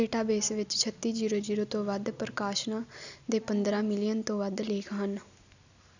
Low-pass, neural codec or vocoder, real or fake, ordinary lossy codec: 7.2 kHz; none; real; none